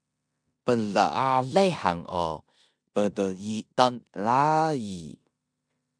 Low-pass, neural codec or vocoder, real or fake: 9.9 kHz; codec, 16 kHz in and 24 kHz out, 0.9 kbps, LongCat-Audio-Codec, four codebook decoder; fake